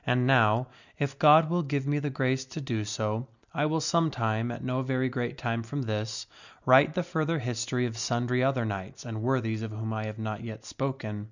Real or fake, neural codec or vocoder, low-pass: real; none; 7.2 kHz